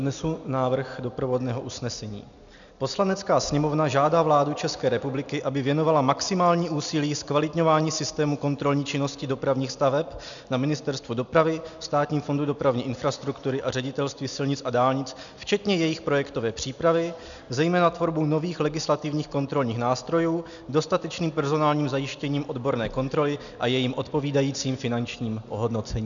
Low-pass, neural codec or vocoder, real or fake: 7.2 kHz; none; real